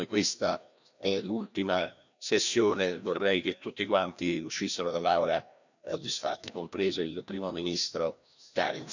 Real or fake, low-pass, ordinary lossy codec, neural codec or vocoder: fake; 7.2 kHz; none; codec, 16 kHz, 1 kbps, FreqCodec, larger model